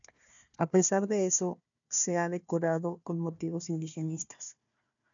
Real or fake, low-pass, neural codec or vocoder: fake; 7.2 kHz; codec, 16 kHz, 1 kbps, FunCodec, trained on Chinese and English, 50 frames a second